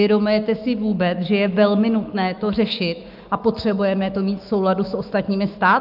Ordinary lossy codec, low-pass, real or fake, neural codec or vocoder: Opus, 24 kbps; 5.4 kHz; fake; autoencoder, 48 kHz, 128 numbers a frame, DAC-VAE, trained on Japanese speech